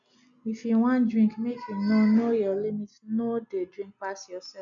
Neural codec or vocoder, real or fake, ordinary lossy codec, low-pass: none; real; none; 7.2 kHz